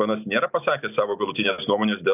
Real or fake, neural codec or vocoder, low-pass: real; none; 3.6 kHz